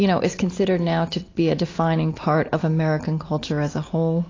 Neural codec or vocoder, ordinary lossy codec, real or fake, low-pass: none; AAC, 32 kbps; real; 7.2 kHz